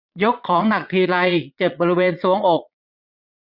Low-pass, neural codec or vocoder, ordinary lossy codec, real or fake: 5.4 kHz; vocoder, 22.05 kHz, 80 mel bands, Vocos; none; fake